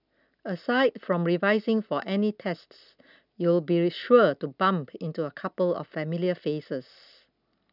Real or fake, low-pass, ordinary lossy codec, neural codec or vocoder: real; 5.4 kHz; none; none